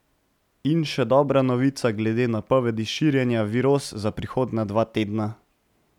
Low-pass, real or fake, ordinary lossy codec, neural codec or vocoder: 19.8 kHz; real; none; none